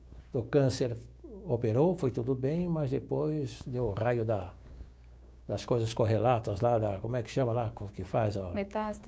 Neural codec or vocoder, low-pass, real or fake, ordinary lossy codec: codec, 16 kHz, 6 kbps, DAC; none; fake; none